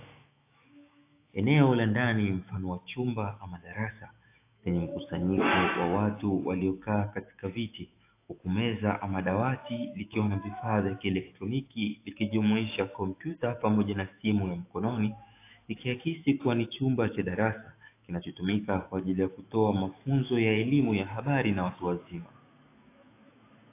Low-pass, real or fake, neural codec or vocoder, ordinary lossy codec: 3.6 kHz; fake; autoencoder, 48 kHz, 128 numbers a frame, DAC-VAE, trained on Japanese speech; AAC, 24 kbps